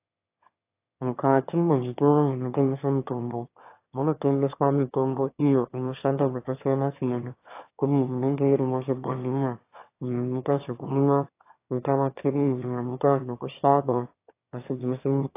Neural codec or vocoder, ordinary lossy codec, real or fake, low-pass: autoencoder, 22.05 kHz, a latent of 192 numbers a frame, VITS, trained on one speaker; AAC, 24 kbps; fake; 3.6 kHz